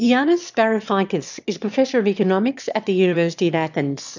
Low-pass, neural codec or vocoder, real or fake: 7.2 kHz; autoencoder, 22.05 kHz, a latent of 192 numbers a frame, VITS, trained on one speaker; fake